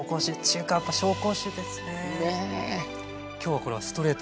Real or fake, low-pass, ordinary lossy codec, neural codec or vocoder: real; none; none; none